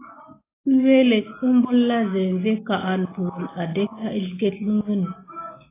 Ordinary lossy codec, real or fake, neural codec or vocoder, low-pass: AAC, 16 kbps; real; none; 3.6 kHz